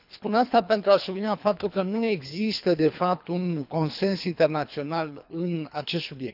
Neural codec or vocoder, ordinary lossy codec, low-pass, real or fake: codec, 24 kHz, 3 kbps, HILCodec; none; 5.4 kHz; fake